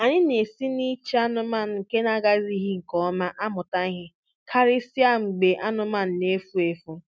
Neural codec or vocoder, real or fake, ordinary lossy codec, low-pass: none; real; none; none